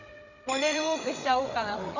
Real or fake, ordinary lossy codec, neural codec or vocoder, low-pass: fake; none; codec, 16 kHz, 16 kbps, FreqCodec, larger model; 7.2 kHz